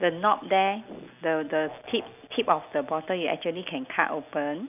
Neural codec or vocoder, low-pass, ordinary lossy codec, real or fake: none; 3.6 kHz; none; real